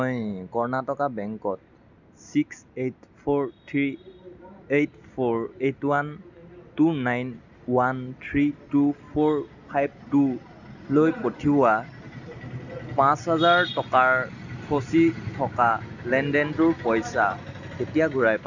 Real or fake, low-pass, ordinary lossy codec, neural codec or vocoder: real; 7.2 kHz; none; none